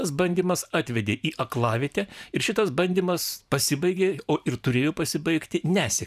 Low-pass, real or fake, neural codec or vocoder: 14.4 kHz; fake; vocoder, 44.1 kHz, 128 mel bands every 512 samples, BigVGAN v2